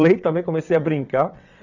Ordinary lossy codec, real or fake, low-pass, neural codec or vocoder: none; fake; 7.2 kHz; vocoder, 22.05 kHz, 80 mel bands, WaveNeXt